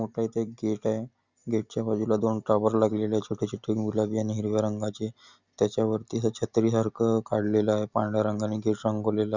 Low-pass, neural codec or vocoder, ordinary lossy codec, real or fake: 7.2 kHz; none; none; real